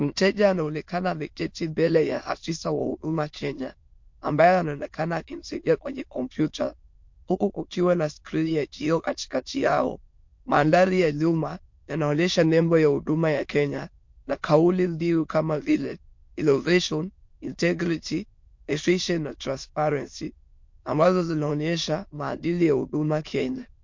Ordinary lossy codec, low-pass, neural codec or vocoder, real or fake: MP3, 48 kbps; 7.2 kHz; autoencoder, 22.05 kHz, a latent of 192 numbers a frame, VITS, trained on many speakers; fake